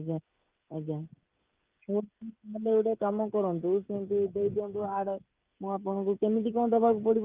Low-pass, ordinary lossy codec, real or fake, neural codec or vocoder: 3.6 kHz; Opus, 32 kbps; fake; codec, 16 kHz, 8 kbps, FreqCodec, smaller model